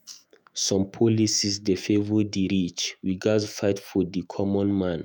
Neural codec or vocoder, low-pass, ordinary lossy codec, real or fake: autoencoder, 48 kHz, 128 numbers a frame, DAC-VAE, trained on Japanese speech; none; none; fake